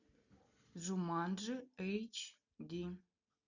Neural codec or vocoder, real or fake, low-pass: none; real; 7.2 kHz